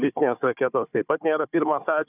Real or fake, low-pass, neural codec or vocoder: fake; 3.6 kHz; codec, 16 kHz, 4 kbps, FunCodec, trained on Chinese and English, 50 frames a second